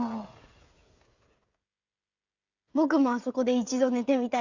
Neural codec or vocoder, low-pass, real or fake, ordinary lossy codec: codec, 16 kHz, 16 kbps, FreqCodec, smaller model; 7.2 kHz; fake; Opus, 64 kbps